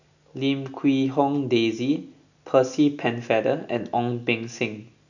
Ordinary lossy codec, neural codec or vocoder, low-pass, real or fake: none; none; 7.2 kHz; real